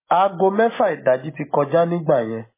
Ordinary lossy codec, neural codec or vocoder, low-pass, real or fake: MP3, 16 kbps; none; 3.6 kHz; real